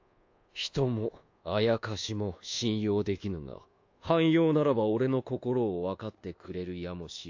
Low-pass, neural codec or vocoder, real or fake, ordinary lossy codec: 7.2 kHz; codec, 24 kHz, 1.2 kbps, DualCodec; fake; AAC, 48 kbps